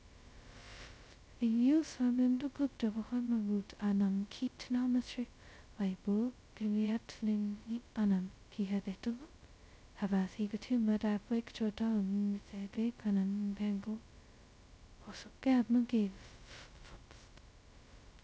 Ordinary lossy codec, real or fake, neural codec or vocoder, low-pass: none; fake; codec, 16 kHz, 0.2 kbps, FocalCodec; none